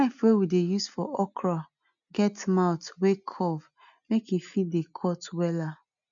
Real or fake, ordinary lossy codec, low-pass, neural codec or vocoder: real; none; 7.2 kHz; none